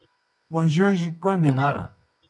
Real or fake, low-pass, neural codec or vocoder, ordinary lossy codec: fake; 10.8 kHz; codec, 24 kHz, 0.9 kbps, WavTokenizer, medium music audio release; MP3, 64 kbps